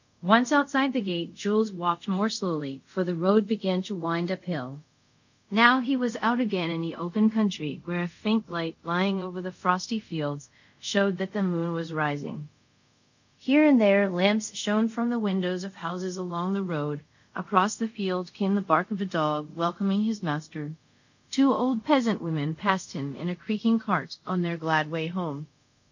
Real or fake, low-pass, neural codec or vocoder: fake; 7.2 kHz; codec, 24 kHz, 0.5 kbps, DualCodec